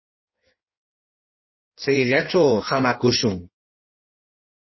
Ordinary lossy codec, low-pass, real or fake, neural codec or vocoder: MP3, 24 kbps; 7.2 kHz; fake; codec, 16 kHz in and 24 kHz out, 1.1 kbps, FireRedTTS-2 codec